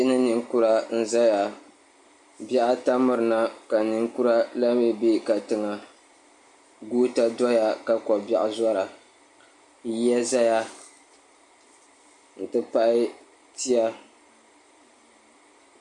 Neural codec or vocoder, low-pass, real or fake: none; 10.8 kHz; real